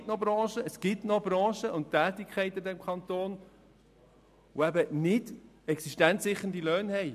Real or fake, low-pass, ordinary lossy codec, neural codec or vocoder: real; 14.4 kHz; MP3, 96 kbps; none